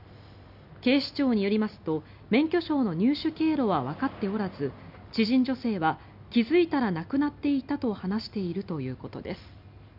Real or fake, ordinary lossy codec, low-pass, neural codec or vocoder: real; none; 5.4 kHz; none